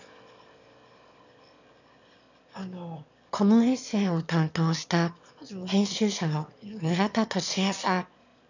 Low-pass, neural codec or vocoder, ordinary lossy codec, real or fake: 7.2 kHz; autoencoder, 22.05 kHz, a latent of 192 numbers a frame, VITS, trained on one speaker; none; fake